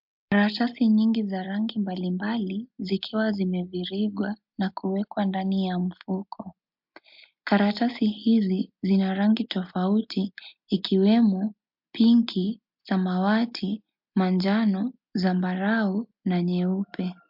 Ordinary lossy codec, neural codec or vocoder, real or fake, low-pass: MP3, 48 kbps; none; real; 5.4 kHz